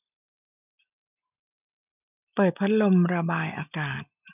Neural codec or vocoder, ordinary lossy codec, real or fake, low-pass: none; none; real; 3.6 kHz